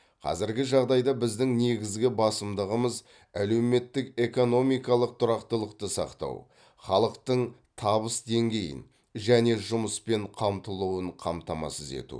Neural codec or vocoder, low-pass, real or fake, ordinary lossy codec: none; 9.9 kHz; real; none